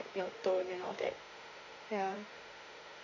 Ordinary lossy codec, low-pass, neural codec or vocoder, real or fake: none; 7.2 kHz; autoencoder, 48 kHz, 32 numbers a frame, DAC-VAE, trained on Japanese speech; fake